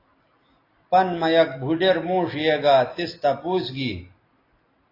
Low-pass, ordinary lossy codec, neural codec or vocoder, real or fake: 5.4 kHz; AAC, 24 kbps; none; real